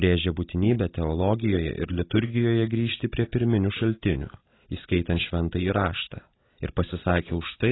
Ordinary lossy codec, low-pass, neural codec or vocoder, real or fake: AAC, 16 kbps; 7.2 kHz; none; real